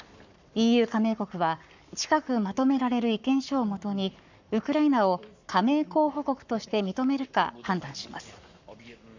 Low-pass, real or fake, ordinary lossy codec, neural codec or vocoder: 7.2 kHz; fake; none; codec, 44.1 kHz, 7.8 kbps, Pupu-Codec